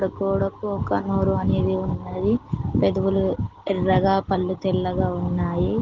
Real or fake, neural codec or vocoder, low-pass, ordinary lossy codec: real; none; 7.2 kHz; Opus, 16 kbps